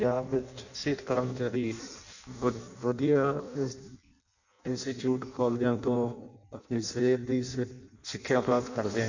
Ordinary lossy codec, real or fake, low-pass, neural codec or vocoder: none; fake; 7.2 kHz; codec, 16 kHz in and 24 kHz out, 0.6 kbps, FireRedTTS-2 codec